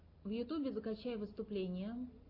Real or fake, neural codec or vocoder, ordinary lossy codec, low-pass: real; none; AAC, 32 kbps; 5.4 kHz